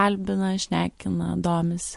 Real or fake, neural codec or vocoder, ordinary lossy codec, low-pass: real; none; MP3, 48 kbps; 14.4 kHz